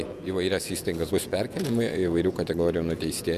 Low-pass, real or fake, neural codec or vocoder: 14.4 kHz; fake; vocoder, 48 kHz, 128 mel bands, Vocos